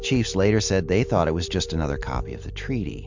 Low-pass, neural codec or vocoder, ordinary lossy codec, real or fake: 7.2 kHz; none; MP3, 64 kbps; real